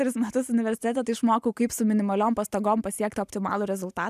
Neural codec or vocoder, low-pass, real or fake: none; 14.4 kHz; real